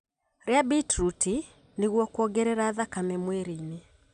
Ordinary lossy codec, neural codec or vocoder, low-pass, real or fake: none; none; 9.9 kHz; real